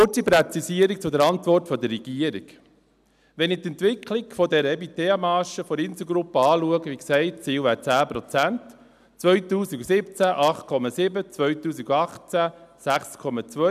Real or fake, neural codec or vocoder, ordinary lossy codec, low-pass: real; none; none; 14.4 kHz